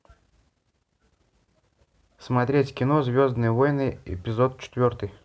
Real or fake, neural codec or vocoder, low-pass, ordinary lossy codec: real; none; none; none